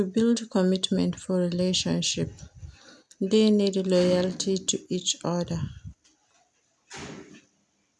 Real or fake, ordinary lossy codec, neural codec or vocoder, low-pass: real; none; none; none